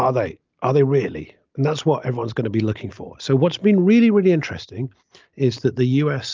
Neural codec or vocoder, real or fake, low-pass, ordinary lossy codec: none; real; 7.2 kHz; Opus, 24 kbps